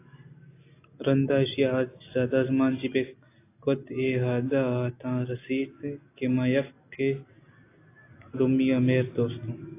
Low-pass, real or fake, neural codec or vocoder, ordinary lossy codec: 3.6 kHz; real; none; AAC, 24 kbps